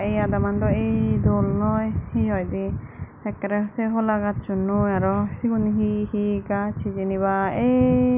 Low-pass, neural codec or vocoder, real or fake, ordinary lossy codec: 3.6 kHz; none; real; none